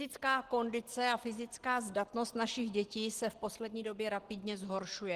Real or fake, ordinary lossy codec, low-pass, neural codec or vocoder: real; Opus, 16 kbps; 14.4 kHz; none